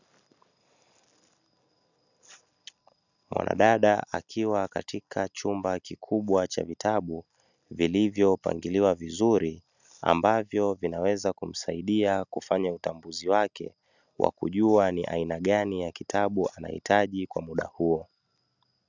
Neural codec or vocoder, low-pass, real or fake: none; 7.2 kHz; real